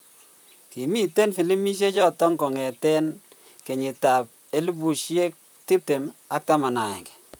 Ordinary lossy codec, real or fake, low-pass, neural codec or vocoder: none; fake; none; vocoder, 44.1 kHz, 128 mel bands, Pupu-Vocoder